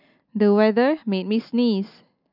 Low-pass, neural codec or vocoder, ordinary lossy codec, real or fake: 5.4 kHz; none; none; real